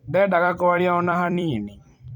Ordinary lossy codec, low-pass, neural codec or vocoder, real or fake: none; 19.8 kHz; vocoder, 44.1 kHz, 128 mel bands every 256 samples, BigVGAN v2; fake